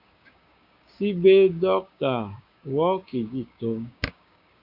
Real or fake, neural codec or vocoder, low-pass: fake; codec, 16 kHz, 6 kbps, DAC; 5.4 kHz